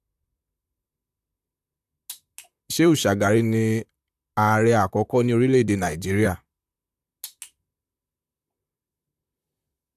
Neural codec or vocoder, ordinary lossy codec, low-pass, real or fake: vocoder, 44.1 kHz, 128 mel bands, Pupu-Vocoder; AAC, 96 kbps; 14.4 kHz; fake